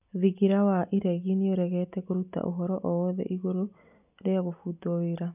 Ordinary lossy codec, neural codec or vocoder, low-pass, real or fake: none; none; 3.6 kHz; real